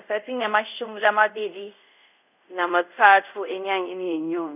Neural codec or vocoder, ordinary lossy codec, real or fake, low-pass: codec, 24 kHz, 0.5 kbps, DualCodec; none; fake; 3.6 kHz